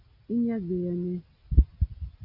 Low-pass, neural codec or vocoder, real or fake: 5.4 kHz; none; real